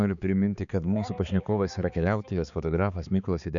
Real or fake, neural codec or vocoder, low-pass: fake; codec, 16 kHz, 4 kbps, X-Codec, HuBERT features, trained on balanced general audio; 7.2 kHz